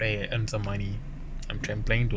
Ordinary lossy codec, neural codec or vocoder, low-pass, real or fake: none; none; none; real